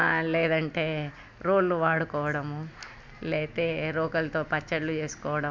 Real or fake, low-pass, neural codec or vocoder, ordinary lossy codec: real; none; none; none